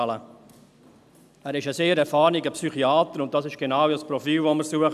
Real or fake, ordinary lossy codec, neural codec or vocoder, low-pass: real; none; none; 14.4 kHz